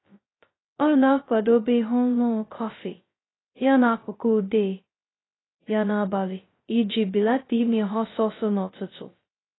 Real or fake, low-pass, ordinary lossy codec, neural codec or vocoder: fake; 7.2 kHz; AAC, 16 kbps; codec, 16 kHz, 0.2 kbps, FocalCodec